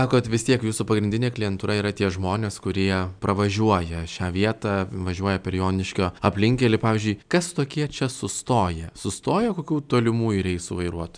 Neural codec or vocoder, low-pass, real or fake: none; 9.9 kHz; real